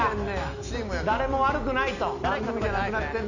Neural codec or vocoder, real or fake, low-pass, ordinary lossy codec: none; real; 7.2 kHz; none